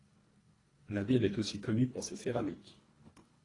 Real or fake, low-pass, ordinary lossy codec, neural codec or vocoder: fake; 10.8 kHz; AAC, 32 kbps; codec, 24 kHz, 1.5 kbps, HILCodec